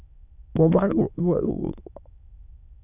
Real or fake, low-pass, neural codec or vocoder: fake; 3.6 kHz; autoencoder, 22.05 kHz, a latent of 192 numbers a frame, VITS, trained on many speakers